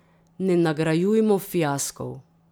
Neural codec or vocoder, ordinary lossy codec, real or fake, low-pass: none; none; real; none